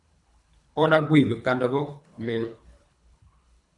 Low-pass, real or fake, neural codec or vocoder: 10.8 kHz; fake; codec, 24 kHz, 3 kbps, HILCodec